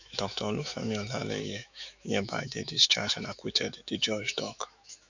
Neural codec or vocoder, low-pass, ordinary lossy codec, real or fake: autoencoder, 48 kHz, 128 numbers a frame, DAC-VAE, trained on Japanese speech; 7.2 kHz; none; fake